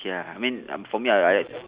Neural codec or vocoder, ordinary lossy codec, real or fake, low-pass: none; Opus, 64 kbps; real; 3.6 kHz